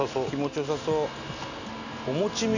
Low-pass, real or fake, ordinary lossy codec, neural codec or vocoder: 7.2 kHz; real; none; none